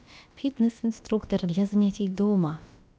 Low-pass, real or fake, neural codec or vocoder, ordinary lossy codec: none; fake; codec, 16 kHz, about 1 kbps, DyCAST, with the encoder's durations; none